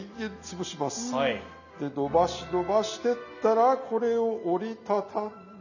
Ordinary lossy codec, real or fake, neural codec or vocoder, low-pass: none; real; none; 7.2 kHz